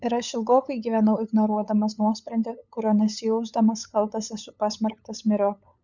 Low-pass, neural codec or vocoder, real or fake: 7.2 kHz; codec, 16 kHz, 8 kbps, FunCodec, trained on LibriTTS, 25 frames a second; fake